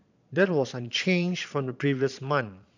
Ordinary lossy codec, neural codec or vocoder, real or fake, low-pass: none; vocoder, 22.05 kHz, 80 mel bands, Vocos; fake; 7.2 kHz